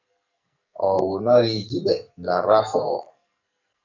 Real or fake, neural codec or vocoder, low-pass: fake; codec, 44.1 kHz, 2.6 kbps, SNAC; 7.2 kHz